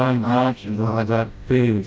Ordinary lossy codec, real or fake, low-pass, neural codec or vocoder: none; fake; none; codec, 16 kHz, 0.5 kbps, FreqCodec, smaller model